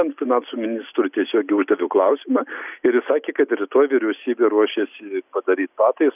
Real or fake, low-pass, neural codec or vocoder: real; 3.6 kHz; none